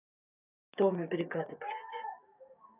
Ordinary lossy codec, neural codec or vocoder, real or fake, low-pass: none; codec, 16 kHz, 6 kbps, DAC; fake; 3.6 kHz